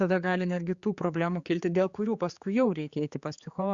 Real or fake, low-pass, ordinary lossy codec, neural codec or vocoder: fake; 7.2 kHz; Opus, 64 kbps; codec, 16 kHz, 4 kbps, X-Codec, HuBERT features, trained on general audio